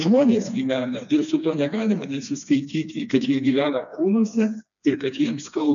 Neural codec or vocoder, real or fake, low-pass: codec, 16 kHz, 2 kbps, FreqCodec, smaller model; fake; 7.2 kHz